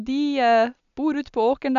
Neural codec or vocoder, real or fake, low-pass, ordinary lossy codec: none; real; 7.2 kHz; none